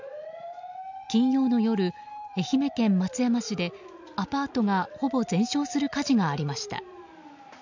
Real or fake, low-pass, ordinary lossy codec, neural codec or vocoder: real; 7.2 kHz; none; none